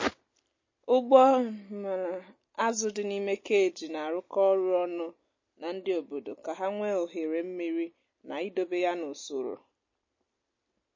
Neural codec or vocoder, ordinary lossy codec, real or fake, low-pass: none; MP3, 32 kbps; real; 7.2 kHz